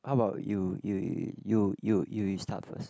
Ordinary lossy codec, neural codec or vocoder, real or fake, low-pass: none; none; real; none